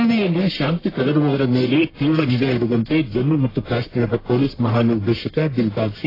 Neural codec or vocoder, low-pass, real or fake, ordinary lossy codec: codec, 44.1 kHz, 1.7 kbps, Pupu-Codec; 5.4 kHz; fake; AAC, 24 kbps